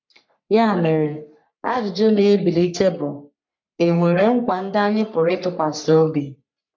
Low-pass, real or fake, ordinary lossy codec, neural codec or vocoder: 7.2 kHz; fake; MP3, 64 kbps; codec, 44.1 kHz, 3.4 kbps, Pupu-Codec